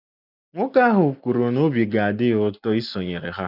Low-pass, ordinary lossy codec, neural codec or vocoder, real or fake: 5.4 kHz; none; none; real